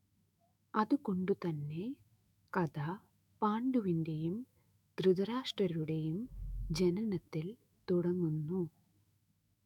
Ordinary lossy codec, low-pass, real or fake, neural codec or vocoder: none; 19.8 kHz; fake; autoencoder, 48 kHz, 128 numbers a frame, DAC-VAE, trained on Japanese speech